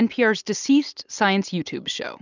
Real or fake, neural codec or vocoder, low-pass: real; none; 7.2 kHz